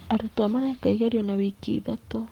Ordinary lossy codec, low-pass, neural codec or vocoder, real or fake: Opus, 32 kbps; 19.8 kHz; codec, 44.1 kHz, 7.8 kbps, Pupu-Codec; fake